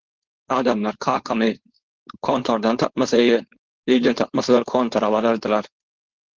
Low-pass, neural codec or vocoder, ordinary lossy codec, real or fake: 7.2 kHz; codec, 16 kHz, 4.8 kbps, FACodec; Opus, 16 kbps; fake